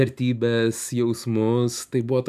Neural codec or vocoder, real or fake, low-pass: none; real; 14.4 kHz